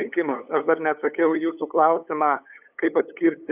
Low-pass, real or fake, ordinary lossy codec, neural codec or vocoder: 3.6 kHz; fake; AAC, 32 kbps; codec, 16 kHz, 8 kbps, FunCodec, trained on LibriTTS, 25 frames a second